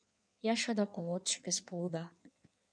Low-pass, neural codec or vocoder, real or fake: 9.9 kHz; codec, 16 kHz in and 24 kHz out, 1.1 kbps, FireRedTTS-2 codec; fake